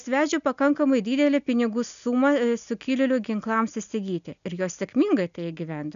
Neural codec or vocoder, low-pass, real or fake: none; 7.2 kHz; real